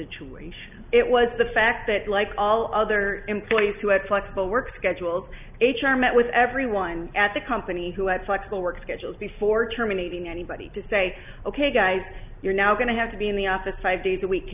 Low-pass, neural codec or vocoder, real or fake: 3.6 kHz; none; real